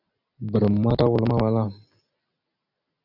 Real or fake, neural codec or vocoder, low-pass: real; none; 5.4 kHz